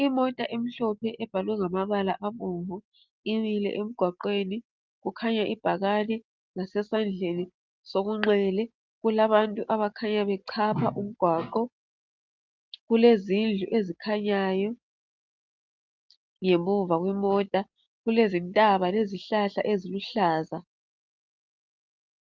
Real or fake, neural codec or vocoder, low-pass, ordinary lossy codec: real; none; 7.2 kHz; Opus, 24 kbps